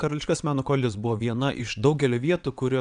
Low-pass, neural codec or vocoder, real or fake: 9.9 kHz; vocoder, 22.05 kHz, 80 mel bands, WaveNeXt; fake